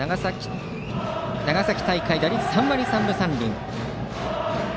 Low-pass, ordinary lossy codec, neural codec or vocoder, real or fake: none; none; none; real